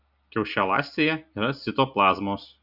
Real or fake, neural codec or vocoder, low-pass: real; none; 5.4 kHz